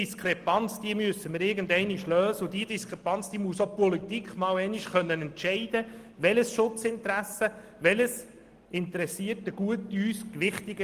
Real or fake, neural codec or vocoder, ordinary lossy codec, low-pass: real; none; Opus, 24 kbps; 14.4 kHz